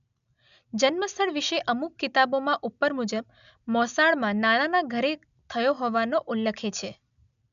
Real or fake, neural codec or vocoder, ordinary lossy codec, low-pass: real; none; MP3, 96 kbps; 7.2 kHz